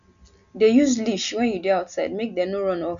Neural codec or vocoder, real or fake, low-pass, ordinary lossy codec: none; real; 7.2 kHz; none